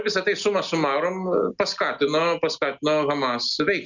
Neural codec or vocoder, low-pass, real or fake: none; 7.2 kHz; real